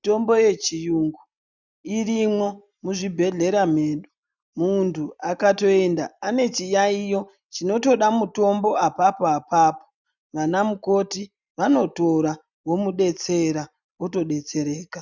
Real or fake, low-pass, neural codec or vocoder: real; 7.2 kHz; none